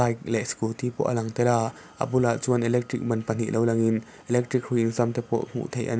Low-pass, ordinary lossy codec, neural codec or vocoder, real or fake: none; none; none; real